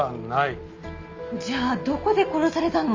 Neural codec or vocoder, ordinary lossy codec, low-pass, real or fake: none; Opus, 32 kbps; 7.2 kHz; real